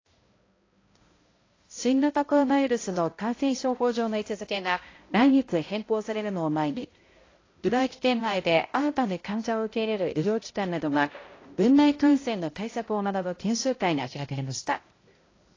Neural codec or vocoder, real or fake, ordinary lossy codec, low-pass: codec, 16 kHz, 0.5 kbps, X-Codec, HuBERT features, trained on balanced general audio; fake; AAC, 32 kbps; 7.2 kHz